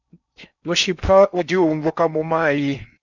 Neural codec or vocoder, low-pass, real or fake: codec, 16 kHz in and 24 kHz out, 0.6 kbps, FocalCodec, streaming, 4096 codes; 7.2 kHz; fake